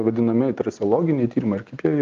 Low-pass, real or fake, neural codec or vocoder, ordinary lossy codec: 7.2 kHz; real; none; Opus, 24 kbps